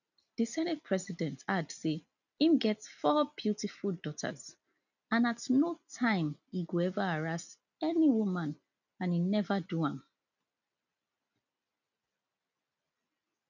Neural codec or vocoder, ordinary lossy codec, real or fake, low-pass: none; none; real; 7.2 kHz